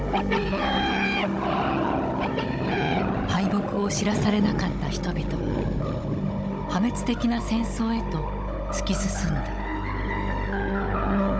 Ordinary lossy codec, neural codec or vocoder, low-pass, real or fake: none; codec, 16 kHz, 16 kbps, FunCodec, trained on Chinese and English, 50 frames a second; none; fake